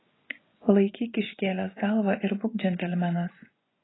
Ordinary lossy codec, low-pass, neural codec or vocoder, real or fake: AAC, 16 kbps; 7.2 kHz; vocoder, 24 kHz, 100 mel bands, Vocos; fake